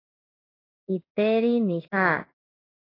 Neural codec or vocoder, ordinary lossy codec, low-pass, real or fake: codec, 16 kHz in and 24 kHz out, 1 kbps, XY-Tokenizer; AAC, 24 kbps; 5.4 kHz; fake